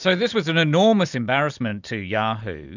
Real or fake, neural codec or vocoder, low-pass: real; none; 7.2 kHz